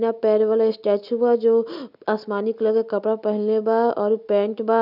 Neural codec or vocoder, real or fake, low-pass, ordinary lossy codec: none; real; 5.4 kHz; none